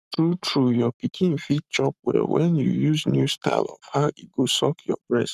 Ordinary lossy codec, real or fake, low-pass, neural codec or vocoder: none; fake; 14.4 kHz; codec, 44.1 kHz, 7.8 kbps, Pupu-Codec